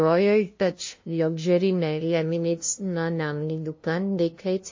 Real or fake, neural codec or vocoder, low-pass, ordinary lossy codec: fake; codec, 16 kHz, 0.5 kbps, FunCodec, trained on LibriTTS, 25 frames a second; 7.2 kHz; MP3, 32 kbps